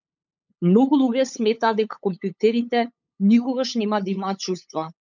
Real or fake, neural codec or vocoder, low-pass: fake; codec, 16 kHz, 8 kbps, FunCodec, trained on LibriTTS, 25 frames a second; 7.2 kHz